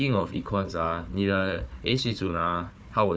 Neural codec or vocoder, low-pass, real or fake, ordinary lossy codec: codec, 16 kHz, 4 kbps, FunCodec, trained on Chinese and English, 50 frames a second; none; fake; none